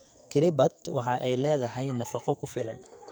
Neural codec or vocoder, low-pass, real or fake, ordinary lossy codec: codec, 44.1 kHz, 2.6 kbps, SNAC; none; fake; none